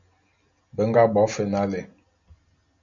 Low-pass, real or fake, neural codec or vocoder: 7.2 kHz; real; none